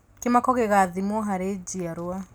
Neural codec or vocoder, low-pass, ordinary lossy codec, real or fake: none; none; none; real